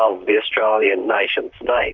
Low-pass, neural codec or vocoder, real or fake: 7.2 kHz; vocoder, 44.1 kHz, 128 mel bands, Pupu-Vocoder; fake